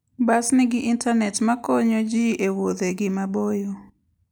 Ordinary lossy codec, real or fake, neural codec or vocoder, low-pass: none; real; none; none